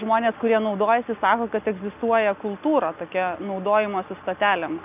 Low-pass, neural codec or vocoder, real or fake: 3.6 kHz; none; real